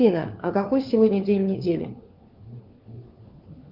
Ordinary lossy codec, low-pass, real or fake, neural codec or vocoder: Opus, 32 kbps; 5.4 kHz; fake; codec, 16 kHz, 4 kbps, FunCodec, trained on LibriTTS, 50 frames a second